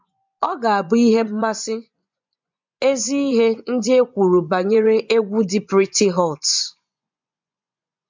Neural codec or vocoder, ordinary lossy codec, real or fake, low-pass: vocoder, 24 kHz, 100 mel bands, Vocos; MP3, 64 kbps; fake; 7.2 kHz